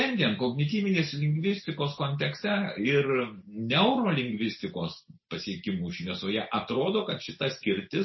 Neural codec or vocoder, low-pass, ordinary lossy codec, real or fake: none; 7.2 kHz; MP3, 24 kbps; real